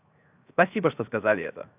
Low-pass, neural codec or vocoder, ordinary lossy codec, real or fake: 3.6 kHz; codec, 16 kHz, 0.7 kbps, FocalCodec; AAC, 32 kbps; fake